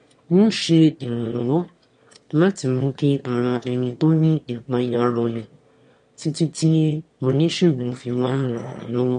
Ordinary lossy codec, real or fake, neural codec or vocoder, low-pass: MP3, 48 kbps; fake; autoencoder, 22.05 kHz, a latent of 192 numbers a frame, VITS, trained on one speaker; 9.9 kHz